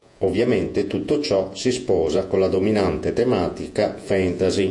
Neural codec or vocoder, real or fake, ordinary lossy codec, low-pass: vocoder, 48 kHz, 128 mel bands, Vocos; fake; AAC, 64 kbps; 10.8 kHz